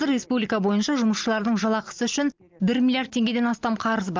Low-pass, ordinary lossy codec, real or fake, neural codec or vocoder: 7.2 kHz; Opus, 32 kbps; real; none